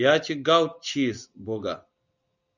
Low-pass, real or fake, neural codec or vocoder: 7.2 kHz; real; none